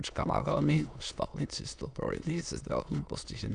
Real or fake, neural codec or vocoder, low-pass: fake; autoencoder, 22.05 kHz, a latent of 192 numbers a frame, VITS, trained on many speakers; 9.9 kHz